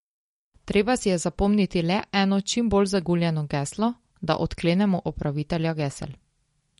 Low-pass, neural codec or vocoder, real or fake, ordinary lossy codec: 19.8 kHz; none; real; MP3, 48 kbps